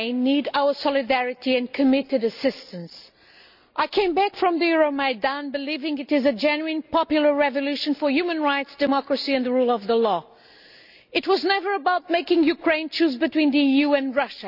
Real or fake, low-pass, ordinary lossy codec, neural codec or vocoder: real; 5.4 kHz; none; none